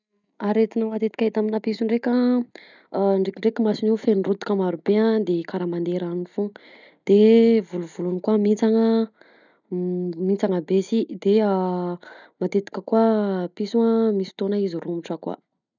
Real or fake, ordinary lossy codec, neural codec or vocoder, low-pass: real; none; none; 7.2 kHz